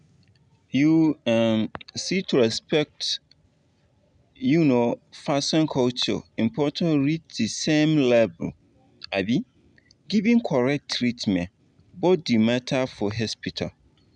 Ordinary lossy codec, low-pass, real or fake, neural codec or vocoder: none; 9.9 kHz; real; none